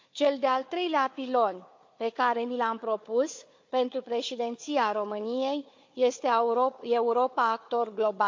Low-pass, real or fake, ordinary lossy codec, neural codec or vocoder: 7.2 kHz; fake; MP3, 48 kbps; codec, 16 kHz, 4 kbps, FunCodec, trained on Chinese and English, 50 frames a second